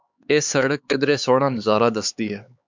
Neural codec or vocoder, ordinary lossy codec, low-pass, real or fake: codec, 16 kHz, 4 kbps, X-Codec, HuBERT features, trained on LibriSpeech; MP3, 64 kbps; 7.2 kHz; fake